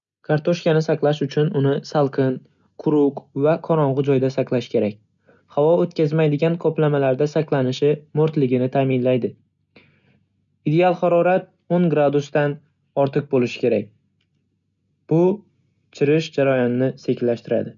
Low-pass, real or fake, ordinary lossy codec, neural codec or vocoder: 7.2 kHz; real; none; none